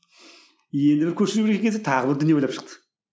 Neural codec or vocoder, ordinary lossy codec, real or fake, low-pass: none; none; real; none